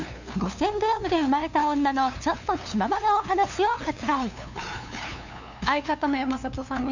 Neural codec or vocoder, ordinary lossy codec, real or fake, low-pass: codec, 16 kHz, 2 kbps, FunCodec, trained on LibriTTS, 25 frames a second; none; fake; 7.2 kHz